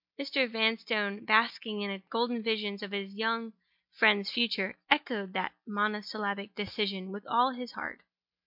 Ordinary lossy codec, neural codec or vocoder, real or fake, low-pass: MP3, 48 kbps; none; real; 5.4 kHz